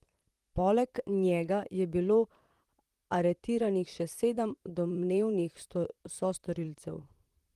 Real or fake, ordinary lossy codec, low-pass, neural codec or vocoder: fake; Opus, 24 kbps; 14.4 kHz; vocoder, 44.1 kHz, 128 mel bands, Pupu-Vocoder